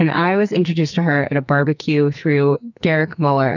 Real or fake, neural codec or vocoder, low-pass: fake; codec, 44.1 kHz, 2.6 kbps, SNAC; 7.2 kHz